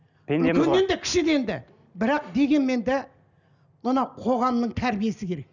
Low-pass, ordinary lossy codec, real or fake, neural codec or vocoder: 7.2 kHz; none; real; none